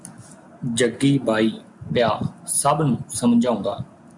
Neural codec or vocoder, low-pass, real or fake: none; 10.8 kHz; real